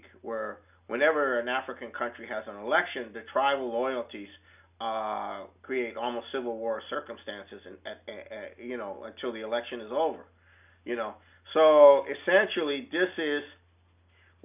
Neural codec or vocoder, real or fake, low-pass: none; real; 3.6 kHz